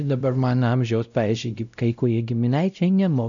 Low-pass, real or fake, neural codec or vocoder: 7.2 kHz; fake; codec, 16 kHz, 0.5 kbps, X-Codec, WavLM features, trained on Multilingual LibriSpeech